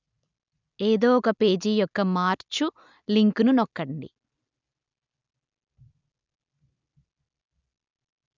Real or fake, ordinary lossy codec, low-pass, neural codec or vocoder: real; none; 7.2 kHz; none